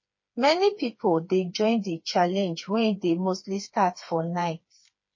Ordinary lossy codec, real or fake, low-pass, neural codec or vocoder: MP3, 32 kbps; fake; 7.2 kHz; codec, 16 kHz, 4 kbps, FreqCodec, smaller model